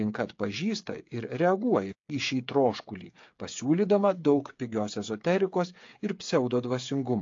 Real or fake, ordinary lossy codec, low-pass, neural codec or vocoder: fake; AAC, 48 kbps; 7.2 kHz; codec, 16 kHz, 8 kbps, FreqCodec, smaller model